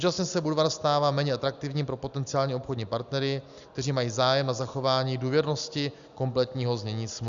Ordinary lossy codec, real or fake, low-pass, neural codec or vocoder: Opus, 64 kbps; real; 7.2 kHz; none